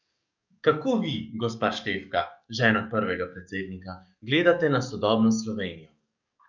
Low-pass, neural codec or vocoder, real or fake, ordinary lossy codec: 7.2 kHz; codec, 44.1 kHz, 7.8 kbps, DAC; fake; none